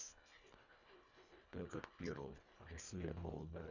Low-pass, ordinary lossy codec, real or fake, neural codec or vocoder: 7.2 kHz; none; fake; codec, 24 kHz, 1.5 kbps, HILCodec